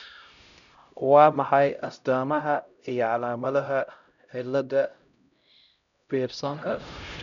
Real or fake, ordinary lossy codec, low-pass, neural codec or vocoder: fake; none; 7.2 kHz; codec, 16 kHz, 0.5 kbps, X-Codec, HuBERT features, trained on LibriSpeech